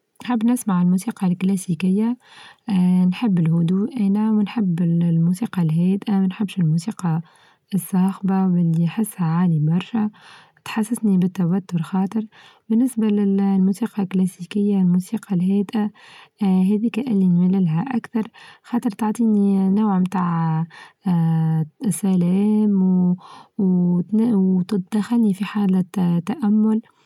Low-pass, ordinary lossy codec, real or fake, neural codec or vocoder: 19.8 kHz; none; real; none